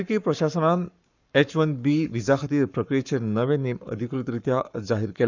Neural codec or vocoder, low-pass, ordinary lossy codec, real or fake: codec, 44.1 kHz, 7.8 kbps, Pupu-Codec; 7.2 kHz; none; fake